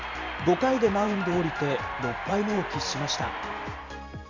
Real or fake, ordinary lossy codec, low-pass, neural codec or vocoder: fake; none; 7.2 kHz; vocoder, 44.1 kHz, 128 mel bands every 512 samples, BigVGAN v2